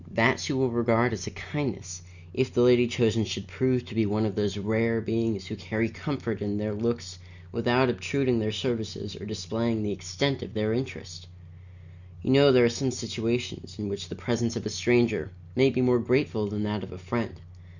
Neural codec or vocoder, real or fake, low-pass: none; real; 7.2 kHz